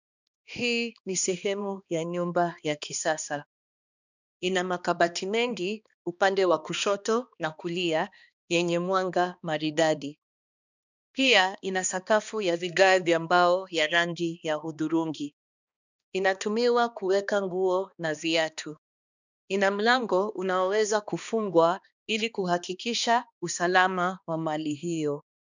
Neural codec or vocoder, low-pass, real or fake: codec, 16 kHz, 2 kbps, X-Codec, HuBERT features, trained on balanced general audio; 7.2 kHz; fake